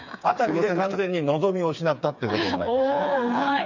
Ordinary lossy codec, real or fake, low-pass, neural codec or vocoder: none; fake; 7.2 kHz; codec, 16 kHz, 4 kbps, FreqCodec, smaller model